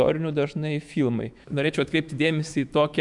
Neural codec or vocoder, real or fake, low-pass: autoencoder, 48 kHz, 128 numbers a frame, DAC-VAE, trained on Japanese speech; fake; 10.8 kHz